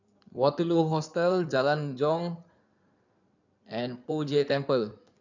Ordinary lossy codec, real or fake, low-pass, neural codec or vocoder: none; fake; 7.2 kHz; codec, 16 kHz in and 24 kHz out, 2.2 kbps, FireRedTTS-2 codec